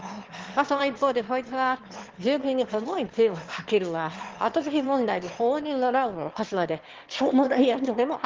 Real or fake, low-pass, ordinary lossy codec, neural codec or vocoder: fake; 7.2 kHz; Opus, 16 kbps; autoencoder, 22.05 kHz, a latent of 192 numbers a frame, VITS, trained on one speaker